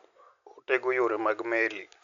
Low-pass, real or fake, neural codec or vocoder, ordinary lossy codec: 7.2 kHz; real; none; none